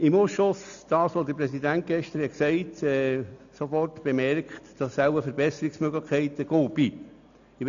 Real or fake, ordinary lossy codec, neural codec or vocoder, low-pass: real; none; none; 7.2 kHz